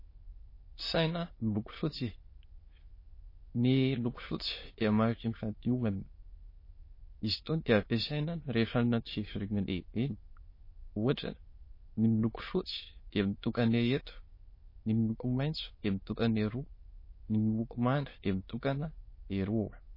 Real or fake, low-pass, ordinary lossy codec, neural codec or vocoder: fake; 5.4 kHz; MP3, 24 kbps; autoencoder, 22.05 kHz, a latent of 192 numbers a frame, VITS, trained on many speakers